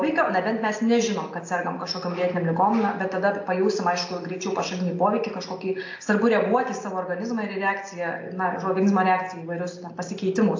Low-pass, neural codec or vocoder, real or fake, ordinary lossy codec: 7.2 kHz; none; real; AAC, 48 kbps